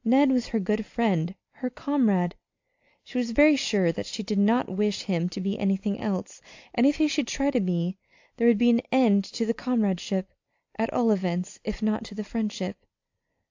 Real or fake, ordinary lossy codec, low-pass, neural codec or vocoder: real; AAC, 48 kbps; 7.2 kHz; none